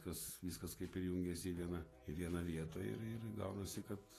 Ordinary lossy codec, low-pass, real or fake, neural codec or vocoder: AAC, 48 kbps; 14.4 kHz; fake; vocoder, 48 kHz, 128 mel bands, Vocos